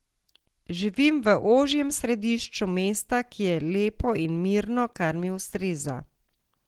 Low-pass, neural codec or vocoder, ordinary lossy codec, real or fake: 19.8 kHz; none; Opus, 16 kbps; real